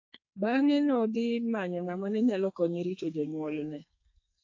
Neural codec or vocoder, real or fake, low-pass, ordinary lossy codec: codec, 32 kHz, 1.9 kbps, SNAC; fake; 7.2 kHz; AAC, 48 kbps